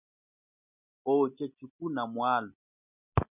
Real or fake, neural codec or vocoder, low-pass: real; none; 3.6 kHz